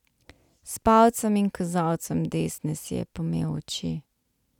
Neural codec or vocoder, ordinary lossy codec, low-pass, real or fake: none; none; 19.8 kHz; real